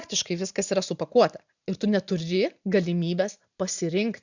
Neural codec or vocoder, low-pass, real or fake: none; 7.2 kHz; real